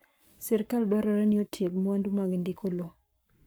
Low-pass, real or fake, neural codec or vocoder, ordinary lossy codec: none; fake; codec, 44.1 kHz, 7.8 kbps, Pupu-Codec; none